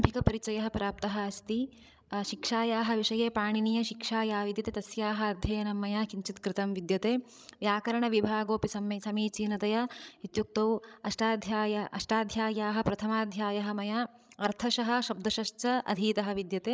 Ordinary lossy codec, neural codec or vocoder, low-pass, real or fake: none; codec, 16 kHz, 16 kbps, FreqCodec, larger model; none; fake